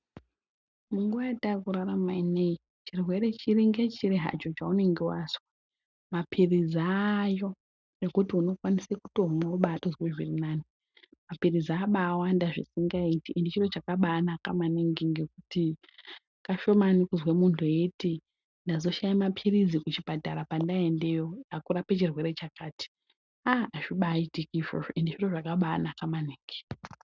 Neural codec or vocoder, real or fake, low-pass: none; real; 7.2 kHz